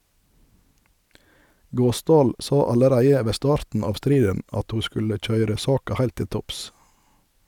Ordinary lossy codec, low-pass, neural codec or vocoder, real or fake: none; 19.8 kHz; none; real